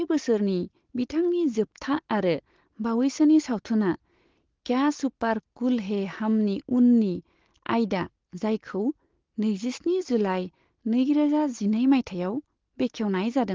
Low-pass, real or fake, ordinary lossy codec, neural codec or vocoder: 7.2 kHz; real; Opus, 16 kbps; none